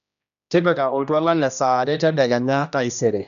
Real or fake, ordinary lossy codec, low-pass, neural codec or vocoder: fake; none; 7.2 kHz; codec, 16 kHz, 1 kbps, X-Codec, HuBERT features, trained on general audio